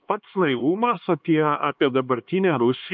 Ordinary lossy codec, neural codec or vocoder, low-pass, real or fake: MP3, 48 kbps; codec, 16 kHz, 2 kbps, X-Codec, HuBERT features, trained on LibriSpeech; 7.2 kHz; fake